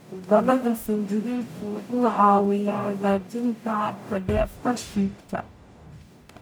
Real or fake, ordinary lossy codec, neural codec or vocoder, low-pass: fake; none; codec, 44.1 kHz, 0.9 kbps, DAC; none